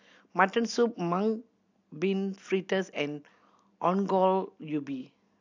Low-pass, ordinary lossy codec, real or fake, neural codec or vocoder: 7.2 kHz; AAC, 48 kbps; real; none